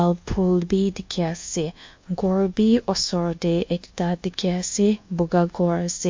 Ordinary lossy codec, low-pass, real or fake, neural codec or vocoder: MP3, 48 kbps; 7.2 kHz; fake; codec, 24 kHz, 1.2 kbps, DualCodec